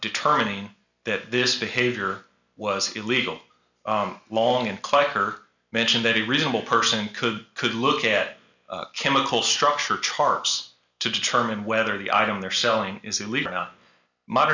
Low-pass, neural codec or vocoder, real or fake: 7.2 kHz; none; real